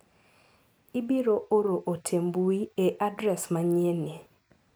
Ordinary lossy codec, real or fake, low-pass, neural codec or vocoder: none; real; none; none